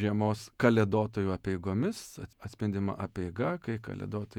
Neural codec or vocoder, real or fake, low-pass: vocoder, 48 kHz, 128 mel bands, Vocos; fake; 19.8 kHz